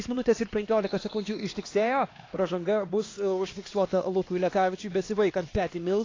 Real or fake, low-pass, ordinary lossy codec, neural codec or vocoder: fake; 7.2 kHz; AAC, 32 kbps; codec, 16 kHz, 2 kbps, X-Codec, HuBERT features, trained on LibriSpeech